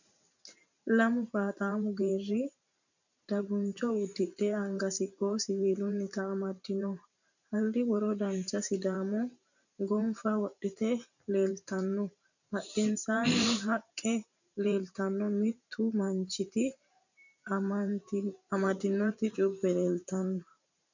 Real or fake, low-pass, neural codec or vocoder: fake; 7.2 kHz; vocoder, 44.1 kHz, 128 mel bands every 512 samples, BigVGAN v2